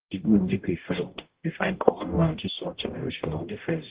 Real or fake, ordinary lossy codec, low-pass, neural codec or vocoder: fake; Opus, 16 kbps; 3.6 kHz; codec, 44.1 kHz, 0.9 kbps, DAC